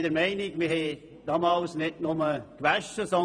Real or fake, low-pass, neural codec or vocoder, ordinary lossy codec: fake; 9.9 kHz; vocoder, 48 kHz, 128 mel bands, Vocos; none